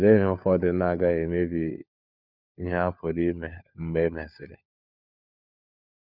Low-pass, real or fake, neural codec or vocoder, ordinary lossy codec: 5.4 kHz; fake; codec, 16 kHz, 4 kbps, FunCodec, trained on LibriTTS, 50 frames a second; none